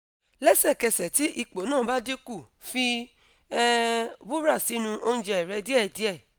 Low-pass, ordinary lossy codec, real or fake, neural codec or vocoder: none; none; real; none